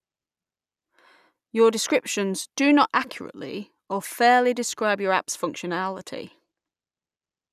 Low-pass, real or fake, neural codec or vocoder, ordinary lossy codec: 14.4 kHz; real; none; none